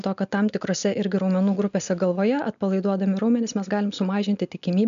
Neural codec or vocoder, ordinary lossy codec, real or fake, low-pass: none; MP3, 64 kbps; real; 7.2 kHz